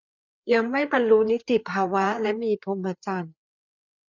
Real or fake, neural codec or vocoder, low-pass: fake; codec, 16 kHz, 4 kbps, FreqCodec, larger model; 7.2 kHz